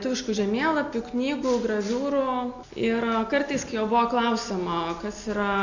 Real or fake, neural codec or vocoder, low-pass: real; none; 7.2 kHz